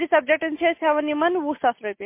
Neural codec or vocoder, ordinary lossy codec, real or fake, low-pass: none; MP3, 24 kbps; real; 3.6 kHz